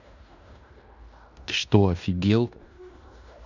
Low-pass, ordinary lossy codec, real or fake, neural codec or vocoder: 7.2 kHz; none; fake; codec, 16 kHz in and 24 kHz out, 0.9 kbps, LongCat-Audio-Codec, fine tuned four codebook decoder